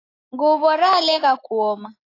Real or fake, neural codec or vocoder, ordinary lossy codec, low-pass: real; none; AAC, 32 kbps; 5.4 kHz